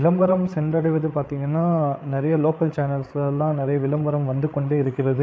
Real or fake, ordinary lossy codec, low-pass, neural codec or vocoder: fake; none; none; codec, 16 kHz, 8 kbps, FreqCodec, larger model